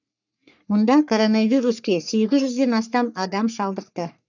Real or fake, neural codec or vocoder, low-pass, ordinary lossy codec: fake; codec, 44.1 kHz, 3.4 kbps, Pupu-Codec; 7.2 kHz; none